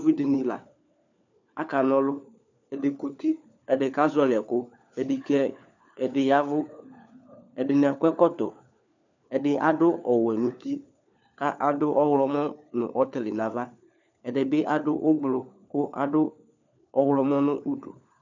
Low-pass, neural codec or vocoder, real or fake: 7.2 kHz; codec, 16 kHz, 4 kbps, FunCodec, trained on LibriTTS, 50 frames a second; fake